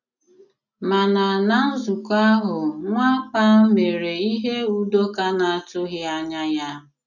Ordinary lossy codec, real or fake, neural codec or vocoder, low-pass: none; real; none; 7.2 kHz